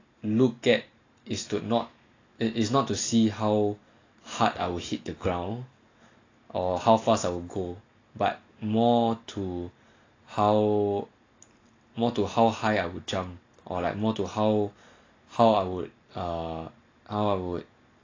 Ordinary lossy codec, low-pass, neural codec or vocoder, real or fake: AAC, 32 kbps; 7.2 kHz; none; real